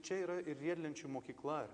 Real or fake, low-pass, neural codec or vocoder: real; 9.9 kHz; none